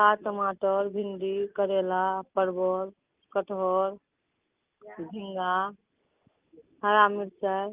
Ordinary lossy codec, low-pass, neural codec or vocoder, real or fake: Opus, 24 kbps; 3.6 kHz; none; real